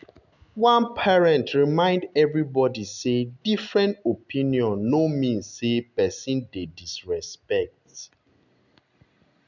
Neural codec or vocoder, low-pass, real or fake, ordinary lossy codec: none; 7.2 kHz; real; none